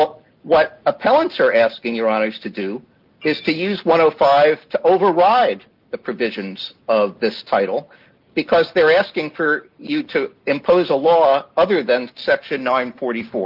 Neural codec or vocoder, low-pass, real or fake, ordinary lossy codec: none; 5.4 kHz; real; Opus, 24 kbps